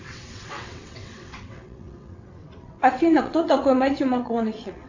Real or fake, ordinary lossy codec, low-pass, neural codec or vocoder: fake; AAC, 48 kbps; 7.2 kHz; vocoder, 22.05 kHz, 80 mel bands, WaveNeXt